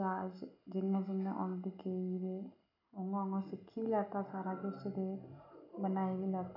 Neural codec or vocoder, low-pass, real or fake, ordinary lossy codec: none; 5.4 kHz; real; none